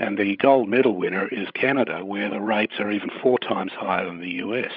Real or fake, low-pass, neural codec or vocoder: fake; 5.4 kHz; codec, 16 kHz, 16 kbps, FreqCodec, larger model